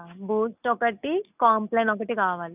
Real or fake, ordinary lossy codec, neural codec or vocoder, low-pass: real; none; none; 3.6 kHz